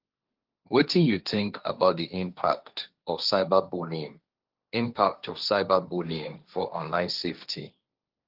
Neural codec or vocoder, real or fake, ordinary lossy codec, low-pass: codec, 16 kHz, 1.1 kbps, Voila-Tokenizer; fake; Opus, 24 kbps; 5.4 kHz